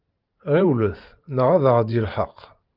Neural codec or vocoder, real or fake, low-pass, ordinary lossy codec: vocoder, 44.1 kHz, 128 mel bands every 512 samples, BigVGAN v2; fake; 5.4 kHz; Opus, 32 kbps